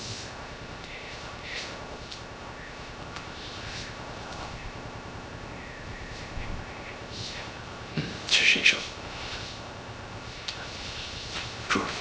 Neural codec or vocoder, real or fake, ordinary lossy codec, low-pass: codec, 16 kHz, 0.3 kbps, FocalCodec; fake; none; none